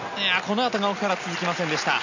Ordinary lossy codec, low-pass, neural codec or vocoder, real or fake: none; 7.2 kHz; none; real